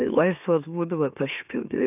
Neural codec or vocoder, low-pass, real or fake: autoencoder, 44.1 kHz, a latent of 192 numbers a frame, MeloTTS; 3.6 kHz; fake